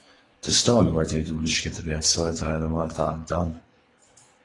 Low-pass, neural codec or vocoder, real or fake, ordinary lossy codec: 10.8 kHz; codec, 24 kHz, 3 kbps, HILCodec; fake; AAC, 32 kbps